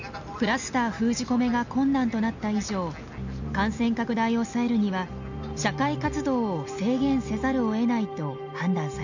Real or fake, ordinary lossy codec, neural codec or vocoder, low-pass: real; none; none; 7.2 kHz